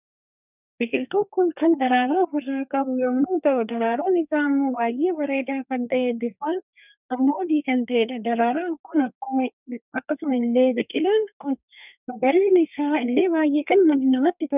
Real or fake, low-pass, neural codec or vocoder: fake; 3.6 kHz; codec, 32 kHz, 1.9 kbps, SNAC